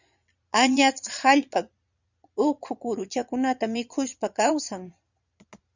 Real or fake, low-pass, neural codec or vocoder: fake; 7.2 kHz; vocoder, 22.05 kHz, 80 mel bands, Vocos